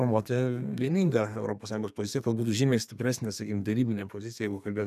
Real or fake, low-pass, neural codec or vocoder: fake; 14.4 kHz; codec, 32 kHz, 1.9 kbps, SNAC